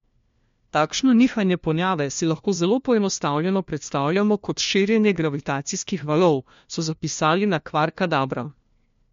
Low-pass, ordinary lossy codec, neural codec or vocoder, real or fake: 7.2 kHz; MP3, 48 kbps; codec, 16 kHz, 1 kbps, FunCodec, trained on LibriTTS, 50 frames a second; fake